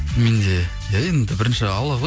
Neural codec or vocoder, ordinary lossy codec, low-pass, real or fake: none; none; none; real